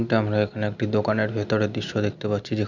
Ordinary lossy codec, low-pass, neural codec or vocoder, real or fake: none; 7.2 kHz; none; real